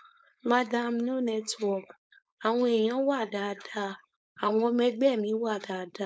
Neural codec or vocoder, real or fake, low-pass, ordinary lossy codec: codec, 16 kHz, 4.8 kbps, FACodec; fake; none; none